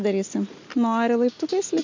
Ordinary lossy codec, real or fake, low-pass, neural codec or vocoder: MP3, 64 kbps; real; 7.2 kHz; none